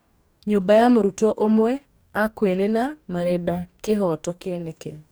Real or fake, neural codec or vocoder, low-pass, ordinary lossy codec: fake; codec, 44.1 kHz, 2.6 kbps, DAC; none; none